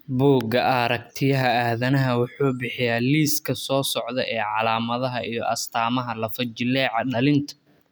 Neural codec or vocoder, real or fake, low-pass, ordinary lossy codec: none; real; none; none